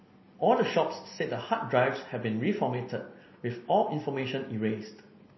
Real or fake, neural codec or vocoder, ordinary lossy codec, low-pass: real; none; MP3, 24 kbps; 7.2 kHz